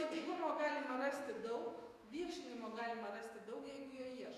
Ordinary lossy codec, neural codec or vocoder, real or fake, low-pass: Opus, 64 kbps; autoencoder, 48 kHz, 128 numbers a frame, DAC-VAE, trained on Japanese speech; fake; 14.4 kHz